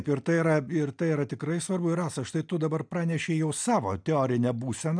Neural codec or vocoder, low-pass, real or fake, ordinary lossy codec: none; 9.9 kHz; real; MP3, 96 kbps